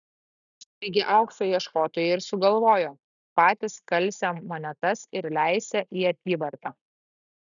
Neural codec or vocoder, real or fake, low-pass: none; real; 7.2 kHz